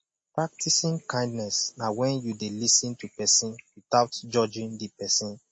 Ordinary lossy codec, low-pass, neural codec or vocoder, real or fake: MP3, 32 kbps; 9.9 kHz; none; real